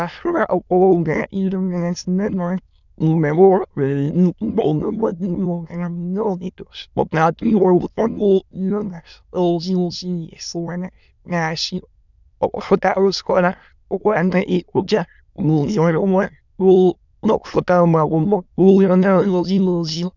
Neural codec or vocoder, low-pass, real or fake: autoencoder, 22.05 kHz, a latent of 192 numbers a frame, VITS, trained on many speakers; 7.2 kHz; fake